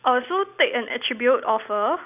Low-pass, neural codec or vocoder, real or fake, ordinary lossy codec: 3.6 kHz; none; real; none